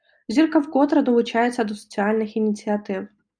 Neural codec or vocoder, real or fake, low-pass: none; real; 9.9 kHz